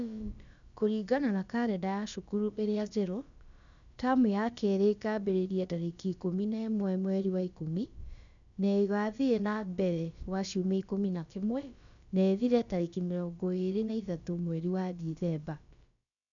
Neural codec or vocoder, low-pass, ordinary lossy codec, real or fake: codec, 16 kHz, about 1 kbps, DyCAST, with the encoder's durations; 7.2 kHz; none; fake